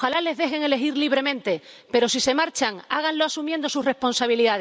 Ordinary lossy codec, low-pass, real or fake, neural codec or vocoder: none; none; real; none